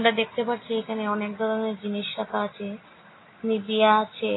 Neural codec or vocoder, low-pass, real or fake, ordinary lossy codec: none; 7.2 kHz; real; AAC, 16 kbps